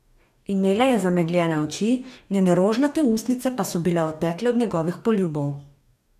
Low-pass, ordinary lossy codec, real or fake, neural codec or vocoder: 14.4 kHz; none; fake; codec, 44.1 kHz, 2.6 kbps, DAC